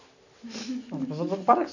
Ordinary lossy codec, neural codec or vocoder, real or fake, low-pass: none; none; real; 7.2 kHz